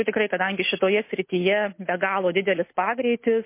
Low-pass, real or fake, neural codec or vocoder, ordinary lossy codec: 3.6 kHz; real; none; MP3, 24 kbps